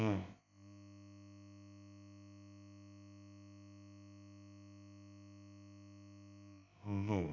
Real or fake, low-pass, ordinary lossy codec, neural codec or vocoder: fake; 7.2 kHz; none; codec, 16 kHz, about 1 kbps, DyCAST, with the encoder's durations